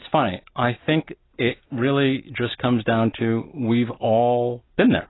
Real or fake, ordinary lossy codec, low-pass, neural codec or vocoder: real; AAC, 16 kbps; 7.2 kHz; none